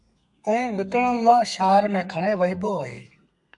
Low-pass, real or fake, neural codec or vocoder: 10.8 kHz; fake; codec, 32 kHz, 1.9 kbps, SNAC